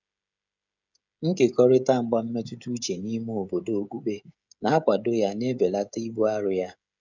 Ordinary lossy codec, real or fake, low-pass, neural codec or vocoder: none; fake; 7.2 kHz; codec, 16 kHz, 16 kbps, FreqCodec, smaller model